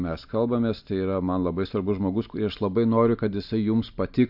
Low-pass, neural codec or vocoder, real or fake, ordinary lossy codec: 5.4 kHz; none; real; AAC, 48 kbps